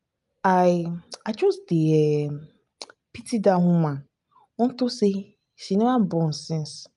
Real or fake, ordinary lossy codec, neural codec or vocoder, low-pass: real; none; none; 10.8 kHz